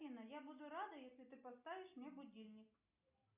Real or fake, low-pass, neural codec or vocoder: real; 3.6 kHz; none